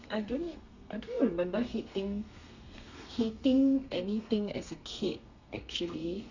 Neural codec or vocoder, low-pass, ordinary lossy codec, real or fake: codec, 32 kHz, 1.9 kbps, SNAC; 7.2 kHz; none; fake